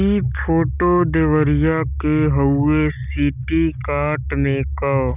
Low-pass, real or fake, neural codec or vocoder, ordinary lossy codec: 3.6 kHz; real; none; none